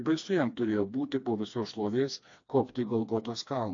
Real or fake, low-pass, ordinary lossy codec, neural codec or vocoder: fake; 7.2 kHz; AAC, 48 kbps; codec, 16 kHz, 2 kbps, FreqCodec, smaller model